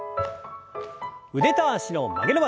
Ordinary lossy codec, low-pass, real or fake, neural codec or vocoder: none; none; real; none